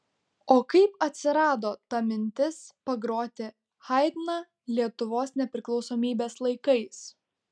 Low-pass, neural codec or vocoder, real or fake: 9.9 kHz; none; real